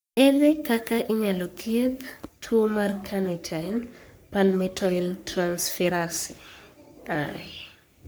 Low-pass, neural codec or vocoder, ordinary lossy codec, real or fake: none; codec, 44.1 kHz, 3.4 kbps, Pupu-Codec; none; fake